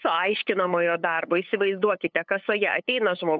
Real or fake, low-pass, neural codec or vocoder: fake; 7.2 kHz; codec, 16 kHz, 8 kbps, FunCodec, trained on LibriTTS, 25 frames a second